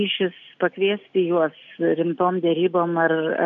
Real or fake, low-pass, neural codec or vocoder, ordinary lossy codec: real; 7.2 kHz; none; AAC, 64 kbps